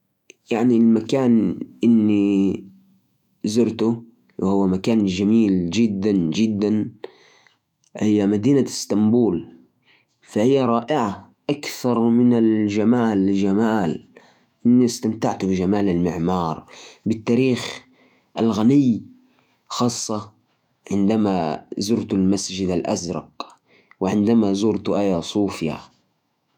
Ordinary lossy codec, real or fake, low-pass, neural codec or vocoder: none; fake; 19.8 kHz; autoencoder, 48 kHz, 128 numbers a frame, DAC-VAE, trained on Japanese speech